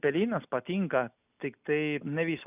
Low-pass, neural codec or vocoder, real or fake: 3.6 kHz; none; real